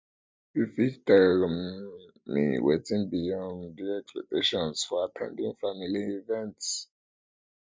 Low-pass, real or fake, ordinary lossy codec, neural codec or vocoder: none; real; none; none